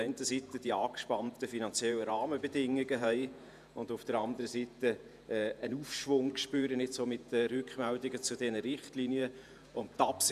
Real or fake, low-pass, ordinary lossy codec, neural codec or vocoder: fake; 14.4 kHz; none; vocoder, 48 kHz, 128 mel bands, Vocos